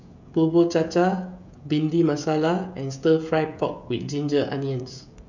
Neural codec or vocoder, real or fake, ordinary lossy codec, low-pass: codec, 16 kHz, 16 kbps, FreqCodec, smaller model; fake; none; 7.2 kHz